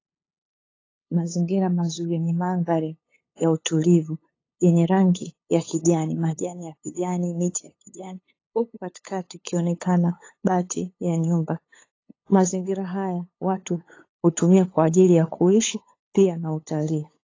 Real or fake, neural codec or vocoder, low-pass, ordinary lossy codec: fake; codec, 16 kHz, 8 kbps, FunCodec, trained on LibriTTS, 25 frames a second; 7.2 kHz; AAC, 32 kbps